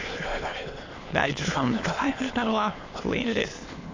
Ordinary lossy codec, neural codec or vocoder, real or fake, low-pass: AAC, 32 kbps; autoencoder, 22.05 kHz, a latent of 192 numbers a frame, VITS, trained on many speakers; fake; 7.2 kHz